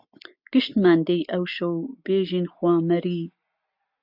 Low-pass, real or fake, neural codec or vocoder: 5.4 kHz; real; none